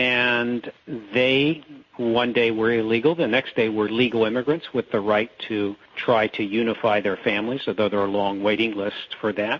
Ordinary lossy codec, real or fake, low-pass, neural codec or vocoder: MP3, 32 kbps; real; 7.2 kHz; none